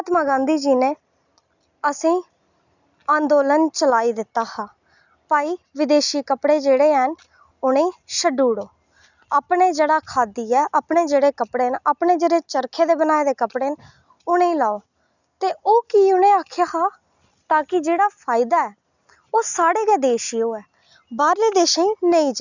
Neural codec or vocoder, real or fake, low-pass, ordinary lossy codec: none; real; 7.2 kHz; none